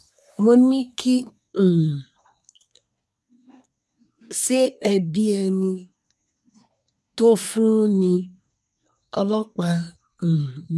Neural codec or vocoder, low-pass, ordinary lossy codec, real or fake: codec, 24 kHz, 1 kbps, SNAC; none; none; fake